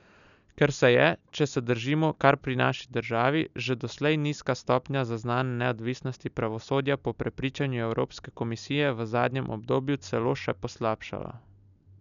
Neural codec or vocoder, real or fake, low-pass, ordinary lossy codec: none; real; 7.2 kHz; none